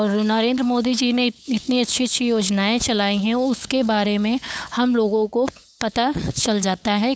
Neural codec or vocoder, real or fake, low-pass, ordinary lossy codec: codec, 16 kHz, 8 kbps, FunCodec, trained on LibriTTS, 25 frames a second; fake; none; none